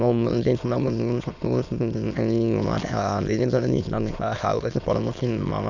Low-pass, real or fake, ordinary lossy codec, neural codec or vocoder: 7.2 kHz; fake; none; autoencoder, 22.05 kHz, a latent of 192 numbers a frame, VITS, trained on many speakers